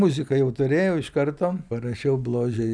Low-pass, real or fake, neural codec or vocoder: 9.9 kHz; real; none